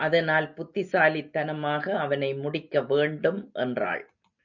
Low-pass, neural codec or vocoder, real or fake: 7.2 kHz; none; real